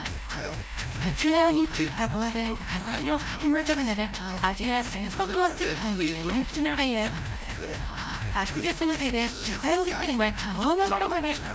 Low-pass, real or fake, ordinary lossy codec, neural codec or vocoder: none; fake; none; codec, 16 kHz, 0.5 kbps, FreqCodec, larger model